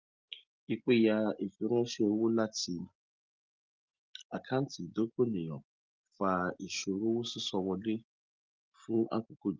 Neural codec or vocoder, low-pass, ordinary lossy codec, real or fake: none; 7.2 kHz; Opus, 24 kbps; real